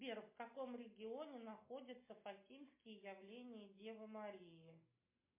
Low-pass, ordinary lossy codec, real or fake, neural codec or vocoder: 3.6 kHz; MP3, 24 kbps; real; none